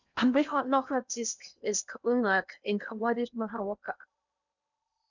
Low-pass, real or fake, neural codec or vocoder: 7.2 kHz; fake; codec, 16 kHz in and 24 kHz out, 0.8 kbps, FocalCodec, streaming, 65536 codes